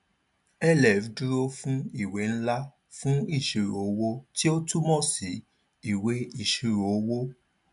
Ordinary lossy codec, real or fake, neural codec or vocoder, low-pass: none; real; none; 10.8 kHz